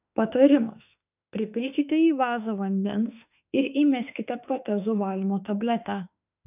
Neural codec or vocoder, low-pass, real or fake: autoencoder, 48 kHz, 32 numbers a frame, DAC-VAE, trained on Japanese speech; 3.6 kHz; fake